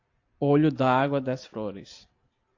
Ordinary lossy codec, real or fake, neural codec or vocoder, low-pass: AAC, 48 kbps; real; none; 7.2 kHz